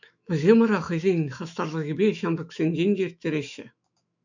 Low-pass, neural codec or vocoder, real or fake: 7.2 kHz; autoencoder, 48 kHz, 128 numbers a frame, DAC-VAE, trained on Japanese speech; fake